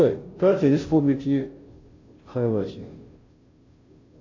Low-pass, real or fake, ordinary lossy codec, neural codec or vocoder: 7.2 kHz; fake; AAC, 48 kbps; codec, 16 kHz, 0.5 kbps, FunCodec, trained on Chinese and English, 25 frames a second